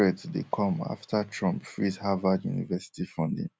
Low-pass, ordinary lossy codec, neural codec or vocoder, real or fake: none; none; none; real